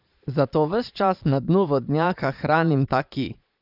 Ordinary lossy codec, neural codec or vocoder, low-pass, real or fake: none; vocoder, 22.05 kHz, 80 mel bands, Vocos; 5.4 kHz; fake